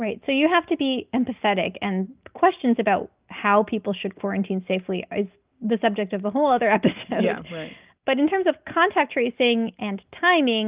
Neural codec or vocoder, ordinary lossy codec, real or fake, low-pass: none; Opus, 24 kbps; real; 3.6 kHz